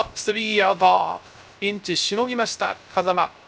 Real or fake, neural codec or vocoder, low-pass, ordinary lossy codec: fake; codec, 16 kHz, 0.3 kbps, FocalCodec; none; none